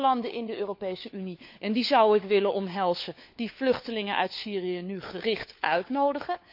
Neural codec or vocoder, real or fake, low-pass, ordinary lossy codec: codec, 16 kHz, 4 kbps, FunCodec, trained on LibriTTS, 50 frames a second; fake; 5.4 kHz; AAC, 48 kbps